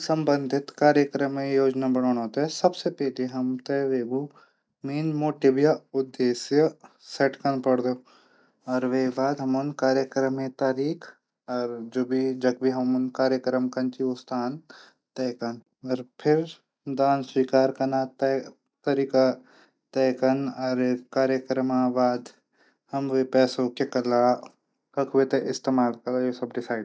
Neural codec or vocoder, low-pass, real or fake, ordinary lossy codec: none; none; real; none